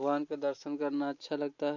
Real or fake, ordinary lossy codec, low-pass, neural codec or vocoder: real; none; 7.2 kHz; none